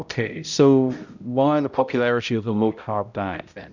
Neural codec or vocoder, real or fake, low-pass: codec, 16 kHz, 0.5 kbps, X-Codec, HuBERT features, trained on balanced general audio; fake; 7.2 kHz